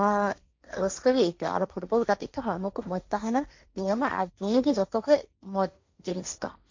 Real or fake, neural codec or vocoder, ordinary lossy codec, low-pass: fake; codec, 16 kHz, 1.1 kbps, Voila-Tokenizer; MP3, 64 kbps; 7.2 kHz